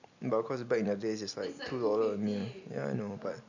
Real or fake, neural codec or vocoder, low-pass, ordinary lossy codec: real; none; 7.2 kHz; none